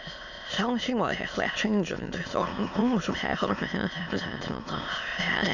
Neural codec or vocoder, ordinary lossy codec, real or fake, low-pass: autoencoder, 22.05 kHz, a latent of 192 numbers a frame, VITS, trained on many speakers; none; fake; 7.2 kHz